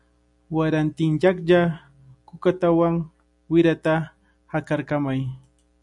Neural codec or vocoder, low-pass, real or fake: none; 10.8 kHz; real